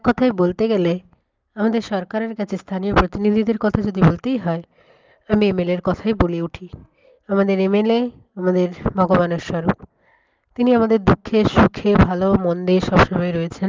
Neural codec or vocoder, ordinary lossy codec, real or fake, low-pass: none; Opus, 32 kbps; real; 7.2 kHz